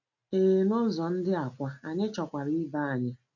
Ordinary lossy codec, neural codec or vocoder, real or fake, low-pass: none; none; real; 7.2 kHz